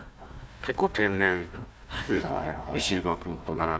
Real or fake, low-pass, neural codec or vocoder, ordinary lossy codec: fake; none; codec, 16 kHz, 1 kbps, FunCodec, trained on Chinese and English, 50 frames a second; none